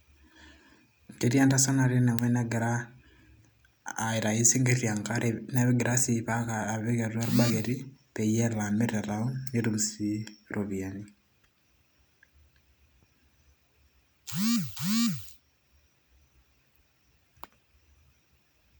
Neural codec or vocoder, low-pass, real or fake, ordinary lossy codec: none; none; real; none